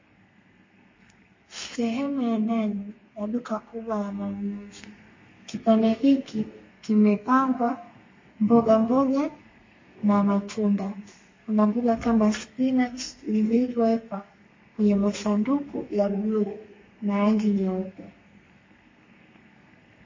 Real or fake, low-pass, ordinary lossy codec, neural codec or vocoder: fake; 7.2 kHz; MP3, 32 kbps; codec, 32 kHz, 1.9 kbps, SNAC